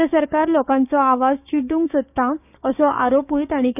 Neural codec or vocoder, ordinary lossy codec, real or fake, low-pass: codec, 16 kHz, 6 kbps, DAC; none; fake; 3.6 kHz